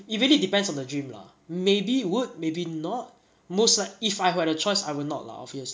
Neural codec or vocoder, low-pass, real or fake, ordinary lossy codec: none; none; real; none